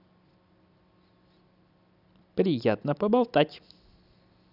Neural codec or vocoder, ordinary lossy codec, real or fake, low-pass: none; none; real; 5.4 kHz